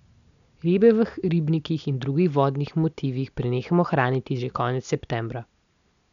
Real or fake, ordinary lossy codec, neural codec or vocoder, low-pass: real; none; none; 7.2 kHz